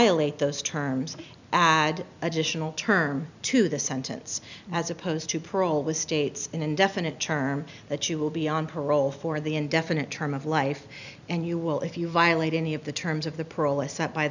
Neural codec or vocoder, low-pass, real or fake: none; 7.2 kHz; real